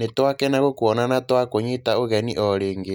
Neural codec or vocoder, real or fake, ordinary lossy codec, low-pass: none; real; none; 19.8 kHz